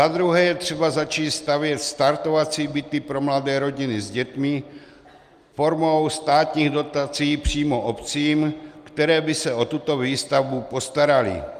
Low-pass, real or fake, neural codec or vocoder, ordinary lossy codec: 14.4 kHz; real; none; Opus, 32 kbps